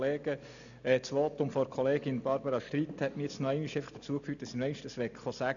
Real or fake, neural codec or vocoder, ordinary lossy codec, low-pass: real; none; none; 7.2 kHz